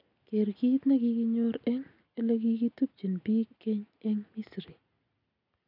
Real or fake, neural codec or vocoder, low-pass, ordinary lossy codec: real; none; 5.4 kHz; none